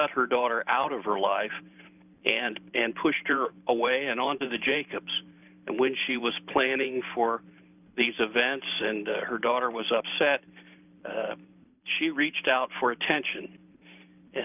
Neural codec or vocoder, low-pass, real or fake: vocoder, 44.1 kHz, 128 mel bands every 512 samples, BigVGAN v2; 3.6 kHz; fake